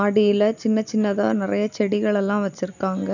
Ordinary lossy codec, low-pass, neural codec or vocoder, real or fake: none; 7.2 kHz; none; real